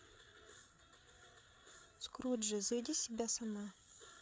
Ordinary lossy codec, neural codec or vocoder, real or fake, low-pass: none; codec, 16 kHz, 8 kbps, FreqCodec, larger model; fake; none